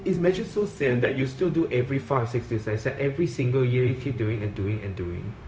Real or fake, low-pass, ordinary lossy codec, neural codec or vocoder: fake; none; none; codec, 16 kHz, 0.4 kbps, LongCat-Audio-Codec